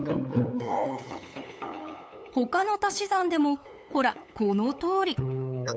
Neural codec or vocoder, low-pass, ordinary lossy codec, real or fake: codec, 16 kHz, 8 kbps, FunCodec, trained on LibriTTS, 25 frames a second; none; none; fake